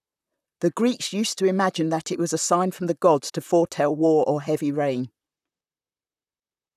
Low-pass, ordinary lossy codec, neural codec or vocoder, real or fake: 14.4 kHz; none; vocoder, 44.1 kHz, 128 mel bands, Pupu-Vocoder; fake